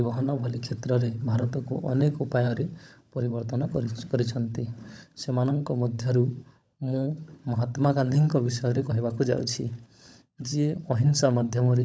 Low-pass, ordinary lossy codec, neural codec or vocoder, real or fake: none; none; codec, 16 kHz, 16 kbps, FunCodec, trained on LibriTTS, 50 frames a second; fake